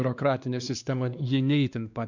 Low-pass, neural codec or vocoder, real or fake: 7.2 kHz; codec, 16 kHz, 2 kbps, X-Codec, WavLM features, trained on Multilingual LibriSpeech; fake